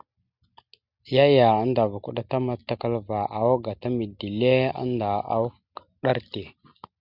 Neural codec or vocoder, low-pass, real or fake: none; 5.4 kHz; real